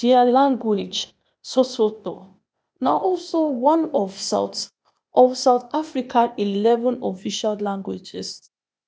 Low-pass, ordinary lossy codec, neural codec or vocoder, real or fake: none; none; codec, 16 kHz, 0.8 kbps, ZipCodec; fake